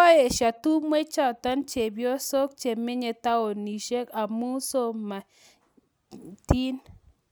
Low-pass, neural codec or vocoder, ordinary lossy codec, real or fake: none; none; none; real